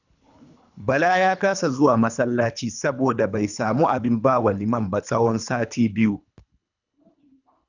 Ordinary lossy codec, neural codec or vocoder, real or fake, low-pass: none; codec, 24 kHz, 3 kbps, HILCodec; fake; 7.2 kHz